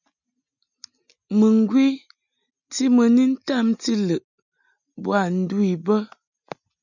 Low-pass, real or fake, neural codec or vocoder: 7.2 kHz; real; none